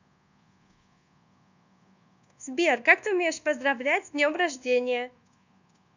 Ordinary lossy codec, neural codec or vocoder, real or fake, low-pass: none; codec, 24 kHz, 1.2 kbps, DualCodec; fake; 7.2 kHz